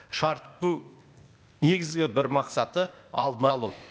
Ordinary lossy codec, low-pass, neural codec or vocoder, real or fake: none; none; codec, 16 kHz, 0.8 kbps, ZipCodec; fake